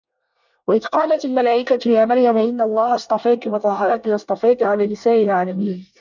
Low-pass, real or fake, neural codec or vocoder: 7.2 kHz; fake; codec, 24 kHz, 1 kbps, SNAC